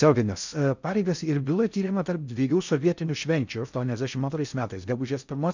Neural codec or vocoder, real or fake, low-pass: codec, 16 kHz in and 24 kHz out, 0.6 kbps, FocalCodec, streaming, 2048 codes; fake; 7.2 kHz